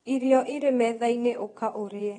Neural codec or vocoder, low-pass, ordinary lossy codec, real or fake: vocoder, 22.05 kHz, 80 mel bands, WaveNeXt; 9.9 kHz; AAC, 32 kbps; fake